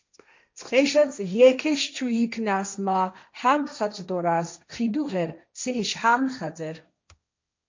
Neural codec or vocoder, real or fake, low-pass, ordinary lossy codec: codec, 16 kHz, 1.1 kbps, Voila-Tokenizer; fake; 7.2 kHz; MP3, 64 kbps